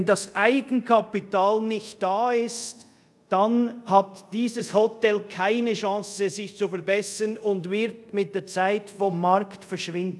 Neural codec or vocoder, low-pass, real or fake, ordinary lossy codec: codec, 24 kHz, 0.5 kbps, DualCodec; none; fake; none